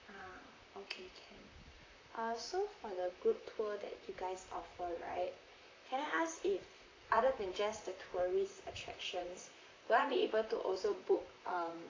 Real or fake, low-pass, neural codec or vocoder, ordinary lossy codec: fake; 7.2 kHz; vocoder, 44.1 kHz, 128 mel bands, Pupu-Vocoder; AAC, 32 kbps